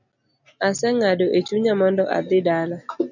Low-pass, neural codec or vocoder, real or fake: 7.2 kHz; none; real